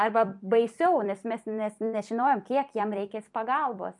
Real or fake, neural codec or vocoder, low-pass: fake; vocoder, 44.1 kHz, 128 mel bands every 256 samples, BigVGAN v2; 10.8 kHz